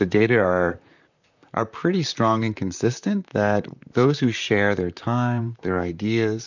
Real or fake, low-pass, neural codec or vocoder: fake; 7.2 kHz; vocoder, 44.1 kHz, 128 mel bands, Pupu-Vocoder